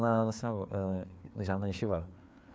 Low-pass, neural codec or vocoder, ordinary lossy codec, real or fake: none; codec, 16 kHz, 4 kbps, FreqCodec, larger model; none; fake